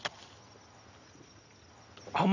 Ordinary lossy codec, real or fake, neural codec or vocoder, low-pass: none; real; none; 7.2 kHz